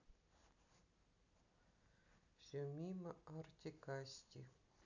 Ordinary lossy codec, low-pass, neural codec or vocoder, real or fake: none; 7.2 kHz; none; real